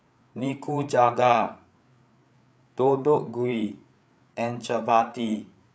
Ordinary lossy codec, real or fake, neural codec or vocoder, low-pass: none; fake; codec, 16 kHz, 8 kbps, FreqCodec, larger model; none